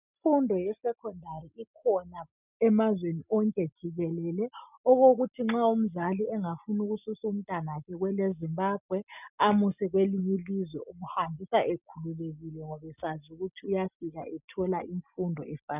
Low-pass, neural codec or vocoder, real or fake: 3.6 kHz; none; real